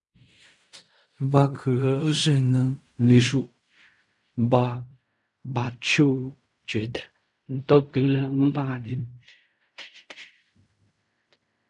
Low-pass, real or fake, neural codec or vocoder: 10.8 kHz; fake; codec, 16 kHz in and 24 kHz out, 0.4 kbps, LongCat-Audio-Codec, fine tuned four codebook decoder